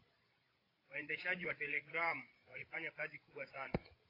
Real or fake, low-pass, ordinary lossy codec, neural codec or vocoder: fake; 5.4 kHz; AAC, 24 kbps; codec, 16 kHz, 8 kbps, FreqCodec, larger model